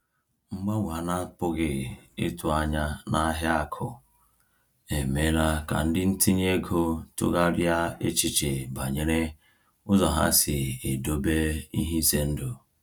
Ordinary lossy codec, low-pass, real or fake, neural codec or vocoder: none; 19.8 kHz; real; none